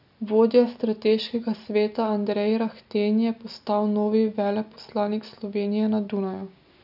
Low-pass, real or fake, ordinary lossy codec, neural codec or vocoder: 5.4 kHz; real; none; none